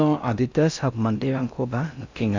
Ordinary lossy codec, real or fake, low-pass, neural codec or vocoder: MP3, 64 kbps; fake; 7.2 kHz; codec, 16 kHz in and 24 kHz out, 0.6 kbps, FocalCodec, streaming, 4096 codes